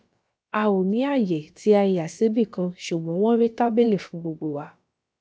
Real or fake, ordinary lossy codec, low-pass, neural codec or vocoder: fake; none; none; codec, 16 kHz, about 1 kbps, DyCAST, with the encoder's durations